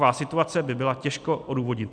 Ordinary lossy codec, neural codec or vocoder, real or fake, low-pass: MP3, 96 kbps; none; real; 9.9 kHz